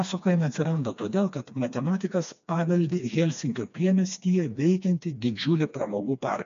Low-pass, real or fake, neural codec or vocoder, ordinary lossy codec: 7.2 kHz; fake; codec, 16 kHz, 2 kbps, FreqCodec, smaller model; MP3, 64 kbps